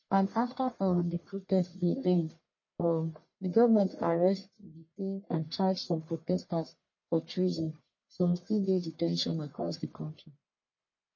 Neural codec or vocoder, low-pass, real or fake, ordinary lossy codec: codec, 44.1 kHz, 1.7 kbps, Pupu-Codec; 7.2 kHz; fake; MP3, 32 kbps